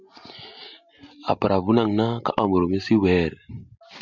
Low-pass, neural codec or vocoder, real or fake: 7.2 kHz; none; real